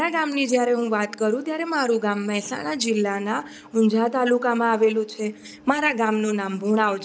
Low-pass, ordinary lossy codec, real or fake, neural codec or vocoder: none; none; real; none